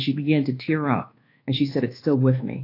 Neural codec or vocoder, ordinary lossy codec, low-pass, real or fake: codec, 24 kHz, 1.2 kbps, DualCodec; AAC, 32 kbps; 5.4 kHz; fake